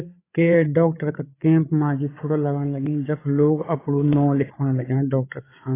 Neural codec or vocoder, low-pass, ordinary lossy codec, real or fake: codec, 16 kHz, 8 kbps, FreqCodec, larger model; 3.6 kHz; AAC, 16 kbps; fake